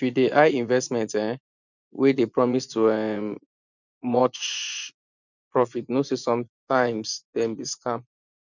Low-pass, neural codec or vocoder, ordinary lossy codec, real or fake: 7.2 kHz; vocoder, 24 kHz, 100 mel bands, Vocos; none; fake